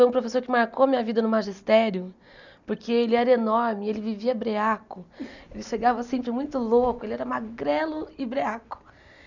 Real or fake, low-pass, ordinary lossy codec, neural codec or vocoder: real; 7.2 kHz; none; none